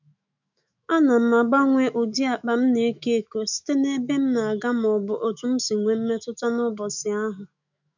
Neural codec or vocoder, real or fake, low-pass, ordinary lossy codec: autoencoder, 48 kHz, 128 numbers a frame, DAC-VAE, trained on Japanese speech; fake; 7.2 kHz; none